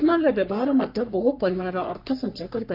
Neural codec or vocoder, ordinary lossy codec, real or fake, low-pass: codec, 44.1 kHz, 3.4 kbps, Pupu-Codec; none; fake; 5.4 kHz